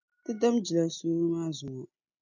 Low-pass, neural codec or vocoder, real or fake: 7.2 kHz; none; real